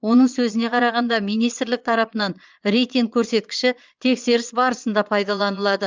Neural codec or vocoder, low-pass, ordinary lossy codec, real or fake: vocoder, 22.05 kHz, 80 mel bands, Vocos; 7.2 kHz; Opus, 32 kbps; fake